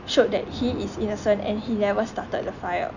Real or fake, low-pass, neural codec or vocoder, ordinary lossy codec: real; 7.2 kHz; none; none